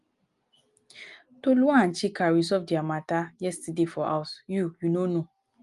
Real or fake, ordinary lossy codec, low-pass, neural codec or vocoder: real; Opus, 32 kbps; 10.8 kHz; none